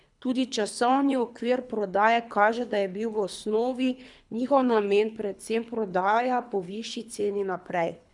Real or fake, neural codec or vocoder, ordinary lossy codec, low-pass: fake; codec, 24 kHz, 3 kbps, HILCodec; none; 10.8 kHz